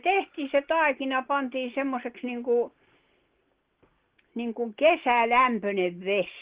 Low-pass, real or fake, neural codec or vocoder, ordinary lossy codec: 3.6 kHz; real; none; Opus, 16 kbps